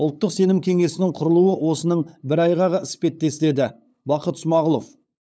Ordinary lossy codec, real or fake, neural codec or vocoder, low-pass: none; fake; codec, 16 kHz, 8 kbps, FunCodec, trained on LibriTTS, 25 frames a second; none